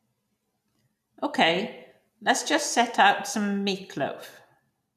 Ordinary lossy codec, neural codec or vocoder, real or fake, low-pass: none; none; real; 14.4 kHz